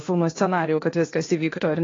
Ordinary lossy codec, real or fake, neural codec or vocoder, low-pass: AAC, 32 kbps; fake; codec, 16 kHz, 0.8 kbps, ZipCodec; 7.2 kHz